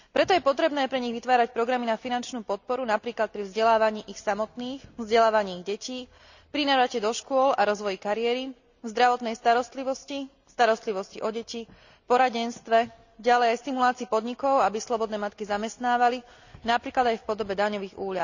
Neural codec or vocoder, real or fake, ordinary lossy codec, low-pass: none; real; none; 7.2 kHz